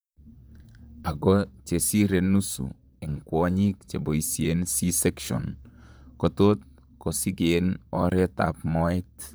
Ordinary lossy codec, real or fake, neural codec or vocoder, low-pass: none; fake; vocoder, 44.1 kHz, 128 mel bands, Pupu-Vocoder; none